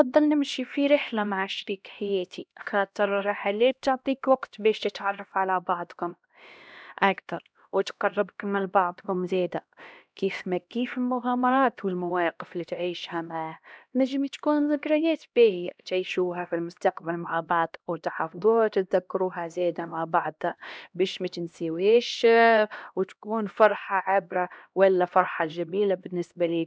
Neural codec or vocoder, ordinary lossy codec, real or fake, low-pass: codec, 16 kHz, 1 kbps, X-Codec, HuBERT features, trained on LibriSpeech; none; fake; none